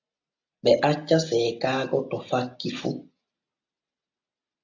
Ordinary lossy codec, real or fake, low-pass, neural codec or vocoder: AAC, 48 kbps; real; 7.2 kHz; none